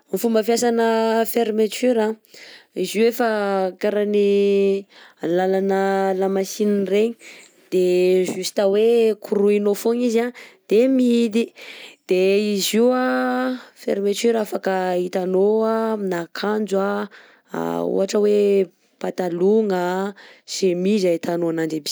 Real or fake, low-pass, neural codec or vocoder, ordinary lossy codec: real; none; none; none